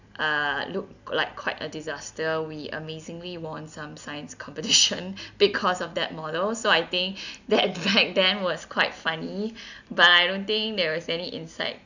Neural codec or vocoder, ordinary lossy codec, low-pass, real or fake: none; none; 7.2 kHz; real